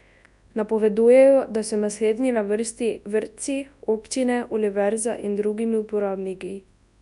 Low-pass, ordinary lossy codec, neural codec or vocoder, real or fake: 10.8 kHz; none; codec, 24 kHz, 0.9 kbps, WavTokenizer, large speech release; fake